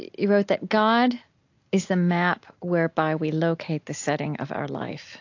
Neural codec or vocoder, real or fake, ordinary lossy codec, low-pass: none; real; AAC, 48 kbps; 7.2 kHz